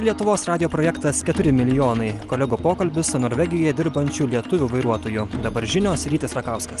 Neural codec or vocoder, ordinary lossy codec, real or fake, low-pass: none; Opus, 24 kbps; real; 10.8 kHz